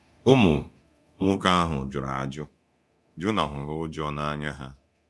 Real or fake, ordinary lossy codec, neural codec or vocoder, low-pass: fake; none; codec, 24 kHz, 0.9 kbps, DualCodec; none